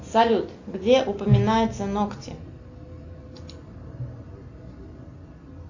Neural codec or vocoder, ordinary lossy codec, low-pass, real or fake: none; AAC, 48 kbps; 7.2 kHz; real